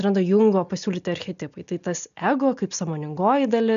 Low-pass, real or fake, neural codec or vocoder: 7.2 kHz; real; none